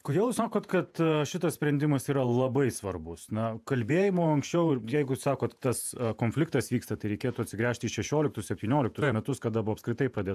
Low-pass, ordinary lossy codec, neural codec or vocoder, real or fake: 14.4 kHz; AAC, 96 kbps; vocoder, 48 kHz, 128 mel bands, Vocos; fake